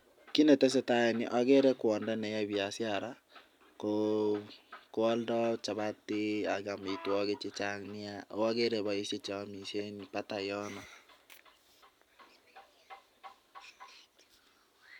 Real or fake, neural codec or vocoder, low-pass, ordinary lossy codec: real; none; 19.8 kHz; none